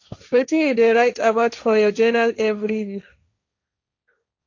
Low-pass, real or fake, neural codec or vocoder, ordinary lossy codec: 7.2 kHz; fake; codec, 16 kHz, 1.1 kbps, Voila-Tokenizer; AAC, 48 kbps